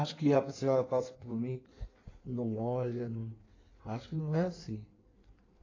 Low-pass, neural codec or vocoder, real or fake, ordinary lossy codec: 7.2 kHz; codec, 16 kHz in and 24 kHz out, 1.1 kbps, FireRedTTS-2 codec; fake; AAC, 32 kbps